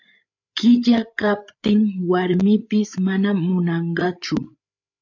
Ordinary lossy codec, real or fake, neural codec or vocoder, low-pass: AAC, 48 kbps; fake; codec, 16 kHz, 8 kbps, FreqCodec, larger model; 7.2 kHz